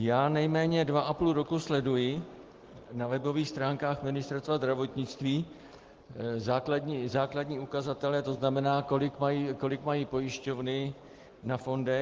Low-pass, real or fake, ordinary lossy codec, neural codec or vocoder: 7.2 kHz; real; Opus, 16 kbps; none